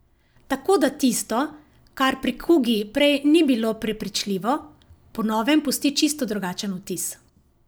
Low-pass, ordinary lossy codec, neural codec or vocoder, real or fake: none; none; none; real